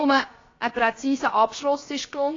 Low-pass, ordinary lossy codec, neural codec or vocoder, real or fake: 7.2 kHz; AAC, 32 kbps; codec, 16 kHz, about 1 kbps, DyCAST, with the encoder's durations; fake